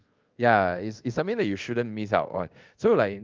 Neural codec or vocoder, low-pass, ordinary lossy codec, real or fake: codec, 24 kHz, 0.5 kbps, DualCodec; 7.2 kHz; Opus, 24 kbps; fake